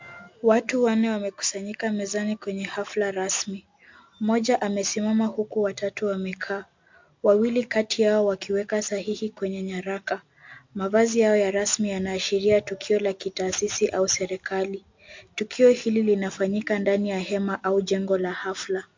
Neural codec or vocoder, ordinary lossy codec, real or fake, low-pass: none; MP3, 48 kbps; real; 7.2 kHz